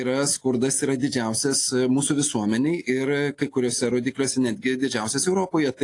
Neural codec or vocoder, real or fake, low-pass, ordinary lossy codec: none; real; 10.8 kHz; AAC, 48 kbps